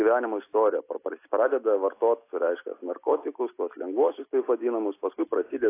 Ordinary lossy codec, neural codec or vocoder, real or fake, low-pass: AAC, 24 kbps; none; real; 3.6 kHz